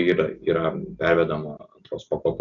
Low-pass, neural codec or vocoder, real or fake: 7.2 kHz; none; real